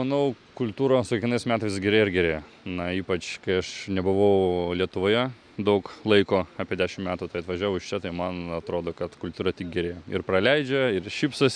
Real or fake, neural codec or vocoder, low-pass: real; none; 9.9 kHz